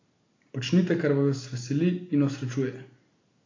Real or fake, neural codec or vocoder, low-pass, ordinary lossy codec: real; none; 7.2 kHz; AAC, 32 kbps